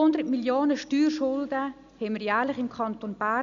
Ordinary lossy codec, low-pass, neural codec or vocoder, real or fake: none; 7.2 kHz; none; real